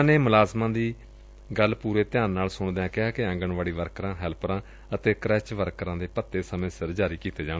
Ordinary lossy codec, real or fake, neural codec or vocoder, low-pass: none; real; none; none